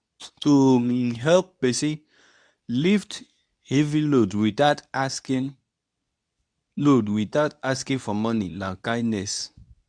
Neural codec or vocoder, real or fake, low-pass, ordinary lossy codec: codec, 24 kHz, 0.9 kbps, WavTokenizer, medium speech release version 2; fake; 9.9 kHz; none